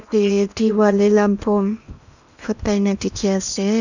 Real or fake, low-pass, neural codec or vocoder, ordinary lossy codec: fake; 7.2 kHz; codec, 16 kHz in and 24 kHz out, 0.8 kbps, FocalCodec, streaming, 65536 codes; none